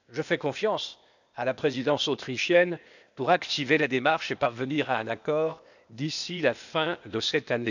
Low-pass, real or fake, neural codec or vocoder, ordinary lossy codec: 7.2 kHz; fake; codec, 16 kHz, 0.8 kbps, ZipCodec; none